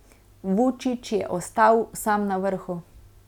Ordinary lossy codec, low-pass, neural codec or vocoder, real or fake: none; 19.8 kHz; none; real